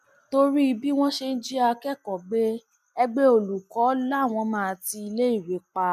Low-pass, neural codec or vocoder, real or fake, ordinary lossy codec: 14.4 kHz; none; real; none